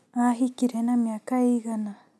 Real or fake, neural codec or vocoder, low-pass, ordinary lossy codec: real; none; none; none